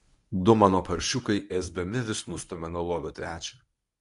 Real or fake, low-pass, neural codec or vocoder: fake; 10.8 kHz; codec, 24 kHz, 0.9 kbps, WavTokenizer, medium speech release version 1